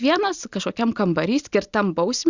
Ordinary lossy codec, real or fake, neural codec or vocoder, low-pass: Opus, 64 kbps; real; none; 7.2 kHz